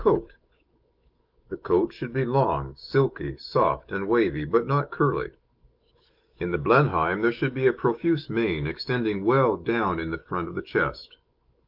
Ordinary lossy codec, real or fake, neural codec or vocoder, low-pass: Opus, 32 kbps; fake; autoencoder, 48 kHz, 128 numbers a frame, DAC-VAE, trained on Japanese speech; 5.4 kHz